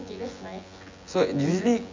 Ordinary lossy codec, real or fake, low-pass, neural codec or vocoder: MP3, 64 kbps; fake; 7.2 kHz; vocoder, 24 kHz, 100 mel bands, Vocos